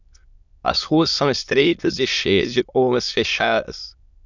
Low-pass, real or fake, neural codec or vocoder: 7.2 kHz; fake; autoencoder, 22.05 kHz, a latent of 192 numbers a frame, VITS, trained on many speakers